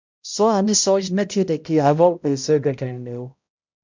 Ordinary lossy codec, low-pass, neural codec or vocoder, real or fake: MP3, 64 kbps; 7.2 kHz; codec, 16 kHz, 0.5 kbps, X-Codec, HuBERT features, trained on balanced general audio; fake